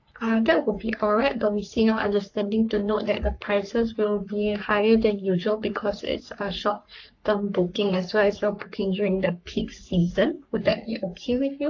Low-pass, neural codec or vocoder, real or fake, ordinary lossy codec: 7.2 kHz; codec, 44.1 kHz, 3.4 kbps, Pupu-Codec; fake; AAC, 48 kbps